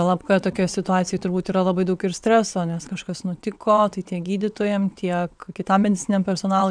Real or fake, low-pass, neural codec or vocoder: fake; 9.9 kHz; vocoder, 22.05 kHz, 80 mel bands, WaveNeXt